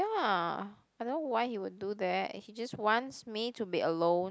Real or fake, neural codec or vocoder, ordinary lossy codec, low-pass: real; none; none; none